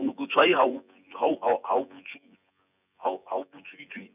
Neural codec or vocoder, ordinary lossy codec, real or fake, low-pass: vocoder, 24 kHz, 100 mel bands, Vocos; none; fake; 3.6 kHz